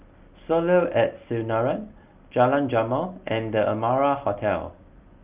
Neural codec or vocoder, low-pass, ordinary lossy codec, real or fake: none; 3.6 kHz; Opus, 32 kbps; real